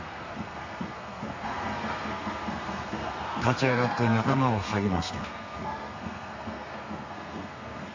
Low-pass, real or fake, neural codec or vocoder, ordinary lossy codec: 7.2 kHz; fake; codec, 32 kHz, 1.9 kbps, SNAC; MP3, 48 kbps